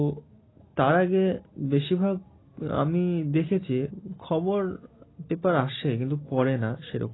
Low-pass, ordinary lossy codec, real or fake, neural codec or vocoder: 7.2 kHz; AAC, 16 kbps; real; none